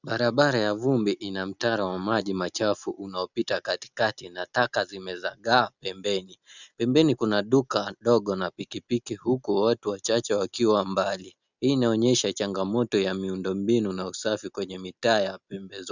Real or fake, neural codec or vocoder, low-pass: real; none; 7.2 kHz